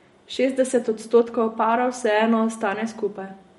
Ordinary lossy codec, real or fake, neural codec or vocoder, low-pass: MP3, 48 kbps; real; none; 19.8 kHz